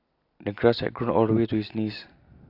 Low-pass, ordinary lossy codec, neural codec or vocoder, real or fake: 5.4 kHz; AAC, 32 kbps; none; real